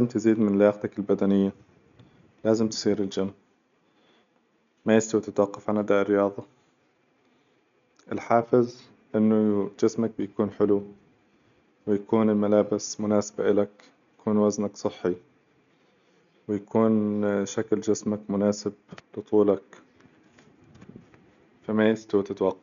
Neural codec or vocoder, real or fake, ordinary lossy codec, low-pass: none; real; none; 7.2 kHz